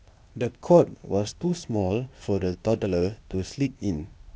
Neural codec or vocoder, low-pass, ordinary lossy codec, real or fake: codec, 16 kHz, 0.8 kbps, ZipCodec; none; none; fake